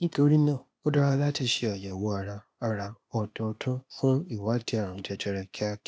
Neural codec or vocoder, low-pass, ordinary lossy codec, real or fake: codec, 16 kHz, 0.8 kbps, ZipCodec; none; none; fake